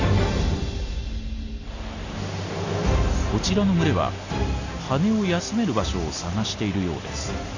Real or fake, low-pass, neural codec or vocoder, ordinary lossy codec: real; 7.2 kHz; none; Opus, 64 kbps